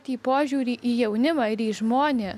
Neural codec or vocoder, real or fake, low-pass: none; real; 14.4 kHz